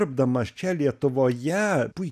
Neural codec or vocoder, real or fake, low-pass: none; real; 14.4 kHz